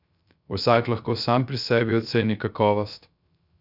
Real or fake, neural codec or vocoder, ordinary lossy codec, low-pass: fake; codec, 16 kHz, 0.3 kbps, FocalCodec; none; 5.4 kHz